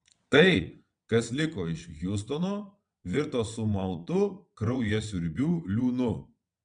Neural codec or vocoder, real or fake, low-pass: vocoder, 22.05 kHz, 80 mel bands, WaveNeXt; fake; 9.9 kHz